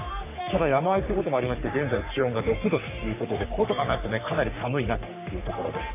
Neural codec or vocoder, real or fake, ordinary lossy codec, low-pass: codec, 44.1 kHz, 3.4 kbps, Pupu-Codec; fake; none; 3.6 kHz